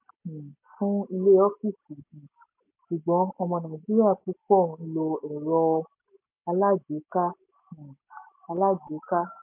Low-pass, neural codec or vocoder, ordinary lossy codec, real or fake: 3.6 kHz; none; MP3, 32 kbps; real